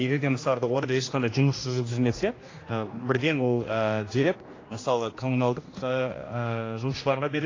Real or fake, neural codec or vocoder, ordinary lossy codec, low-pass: fake; codec, 16 kHz, 1 kbps, X-Codec, HuBERT features, trained on general audio; AAC, 32 kbps; 7.2 kHz